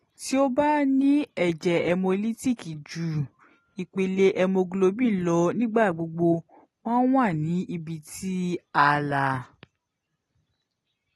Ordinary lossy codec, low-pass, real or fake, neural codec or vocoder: AAC, 32 kbps; 19.8 kHz; real; none